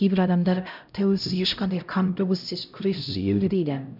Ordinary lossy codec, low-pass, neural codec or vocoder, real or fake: none; 5.4 kHz; codec, 16 kHz, 0.5 kbps, X-Codec, HuBERT features, trained on LibriSpeech; fake